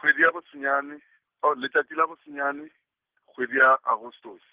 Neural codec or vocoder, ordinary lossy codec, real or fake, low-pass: none; Opus, 16 kbps; real; 3.6 kHz